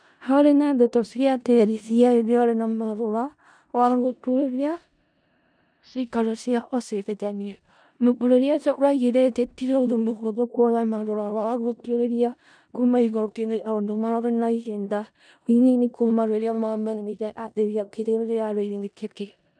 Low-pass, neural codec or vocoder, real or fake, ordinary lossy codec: 9.9 kHz; codec, 16 kHz in and 24 kHz out, 0.4 kbps, LongCat-Audio-Codec, four codebook decoder; fake; none